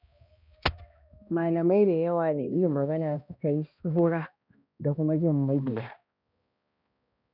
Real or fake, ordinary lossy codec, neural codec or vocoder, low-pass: fake; none; codec, 16 kHz, 1 kbps, X-Codec, HuBERT features, trained on balanced general audio; 5.4 kHz